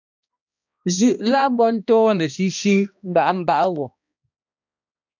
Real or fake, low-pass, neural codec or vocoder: fake; 7.2 kHz; codec, 16 kHz, 1 kbps, X-Codec, HuBERT features, trained on balanced general audio